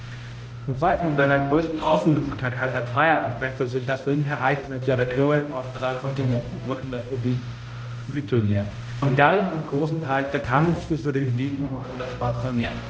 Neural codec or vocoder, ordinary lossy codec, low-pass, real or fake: codec, 16 kHz, 0.5 kbps, X-Codec, HuBERT features, trained on balanced general audio; none; none; fake